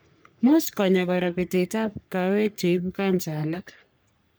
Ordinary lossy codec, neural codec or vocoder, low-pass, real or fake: none; codec, 44.1 kHz, 3.4 kbps, Pupu-Codec; none; fake